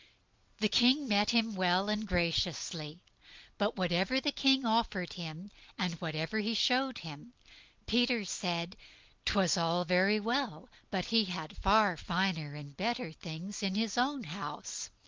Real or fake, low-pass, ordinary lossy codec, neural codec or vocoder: real; 7.2 kHz; Opus, 32 kbps; none